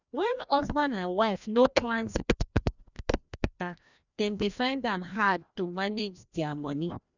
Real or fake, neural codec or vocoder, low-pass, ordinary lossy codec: fake; codec, 16 kHz, 1 kbps, FreqCodec, larger model; 7.2 kHz; none